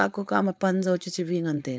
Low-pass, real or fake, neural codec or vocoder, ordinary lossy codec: none; fake; codec, 16 kHz, 4.8 kbps, FACodec; none